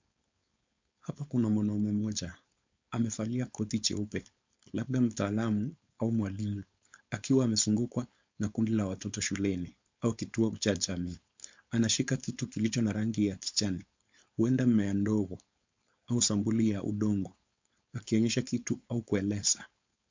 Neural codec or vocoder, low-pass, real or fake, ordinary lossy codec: codec, 16 kHz, 4.8 kbps, FACodec; 7.2 kHz; fake; MP3, 64 kbps